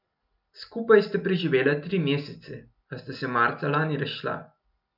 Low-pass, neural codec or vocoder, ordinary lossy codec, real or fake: 5.4 kHz; none; none; real